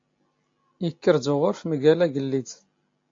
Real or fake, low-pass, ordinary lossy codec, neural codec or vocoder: real; 7.2 kHz; AAC, 48 kbps; none